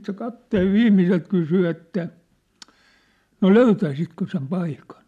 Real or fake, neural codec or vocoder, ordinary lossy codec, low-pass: real; none; MP3, 96 kbps; 14.4 kHz